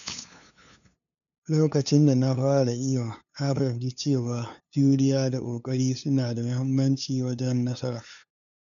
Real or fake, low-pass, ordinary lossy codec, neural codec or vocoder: fake; 7.2 kHz; MP3, 96 kbps; codec, 16 kHz, 2 kbps, FunCodec, trained on LibriTTS, 25 frames a second